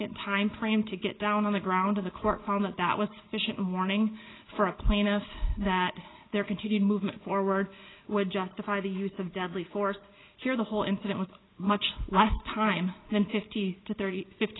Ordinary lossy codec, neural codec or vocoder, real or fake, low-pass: AAC, 16 kbps; none; real; 7.2 kHz